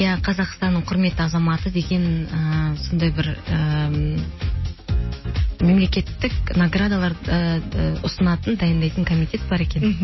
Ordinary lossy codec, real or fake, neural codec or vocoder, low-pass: MP3, 24 kbps; real; none; 7.2 kHz